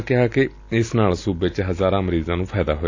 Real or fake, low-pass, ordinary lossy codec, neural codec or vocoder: real; 7.2 kHz; AAC, 48 kbps; none